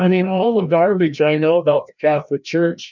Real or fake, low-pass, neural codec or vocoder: fake; 7.2 kHz; codec, 16 kHz, 1 kbps, FreqCodec, larger model